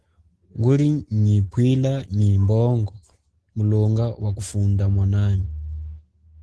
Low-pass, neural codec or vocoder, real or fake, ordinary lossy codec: 10.8 kHz; none; real; Opus, 16 kbps